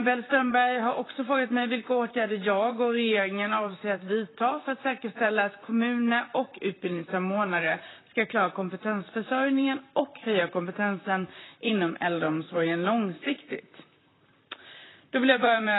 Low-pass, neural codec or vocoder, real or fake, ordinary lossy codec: 7.2 kHz; vocoder, 44.1 kHz, 128 mel bands, Pupu-Vocoder; fake; AAC, 16 kbps